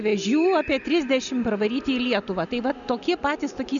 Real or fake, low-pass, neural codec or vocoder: real; 7.2 kHz; none